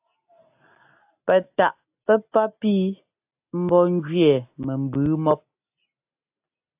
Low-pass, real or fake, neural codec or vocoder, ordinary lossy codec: 3.6 kHz; real; none; AAC, 32 kbps